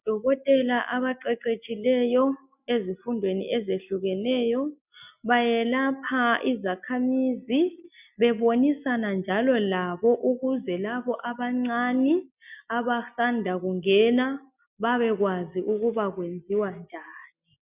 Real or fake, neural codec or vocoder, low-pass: real; none; 3.6 kHz